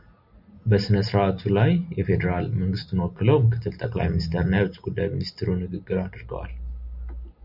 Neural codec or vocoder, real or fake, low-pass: none; real; 5.4 kHz